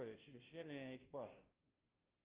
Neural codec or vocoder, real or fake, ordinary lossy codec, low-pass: codec, 16 kHz, 0.5 kbps, FunCodec, trained on Chinese and English, 25 frames a second; fake; Opus, 32 kbps; 3.6 kHz